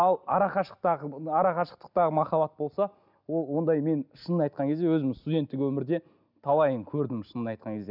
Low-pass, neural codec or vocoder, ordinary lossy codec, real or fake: 5.4 kHz; none; none; real